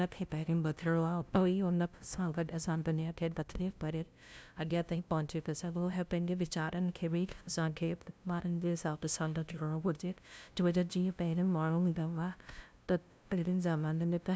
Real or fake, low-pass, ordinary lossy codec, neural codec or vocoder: fake; none; none; codec, 16 kHz, 0.5 kbps, FunCodec, trained on LibriTTS, 25 frames a second